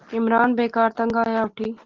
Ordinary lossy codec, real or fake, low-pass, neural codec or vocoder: Opus, 16 kbps; real; 7.2 kHz; none